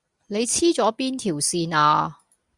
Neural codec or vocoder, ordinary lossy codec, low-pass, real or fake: none; Opus, 64 kbps; 10.8 kHz; real